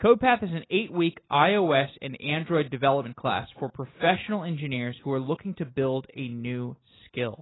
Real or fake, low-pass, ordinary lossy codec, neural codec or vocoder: real; 7.2 kHz; AAC, 16 kbps; none